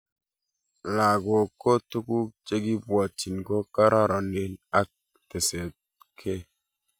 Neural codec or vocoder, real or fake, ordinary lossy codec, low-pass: none; real; none; none